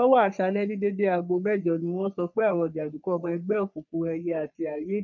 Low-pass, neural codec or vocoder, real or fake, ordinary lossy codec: 7.2 kHz; codec, 44.1 kHz, 3.4 kbps, Pupu-Codec; fake; none